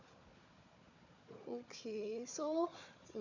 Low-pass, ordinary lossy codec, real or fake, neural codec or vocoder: 7.2 kHz; none; fake; codec, 16 kHz, 4 kbps, FunCodec, trained on Chinese and English, 50 frames a second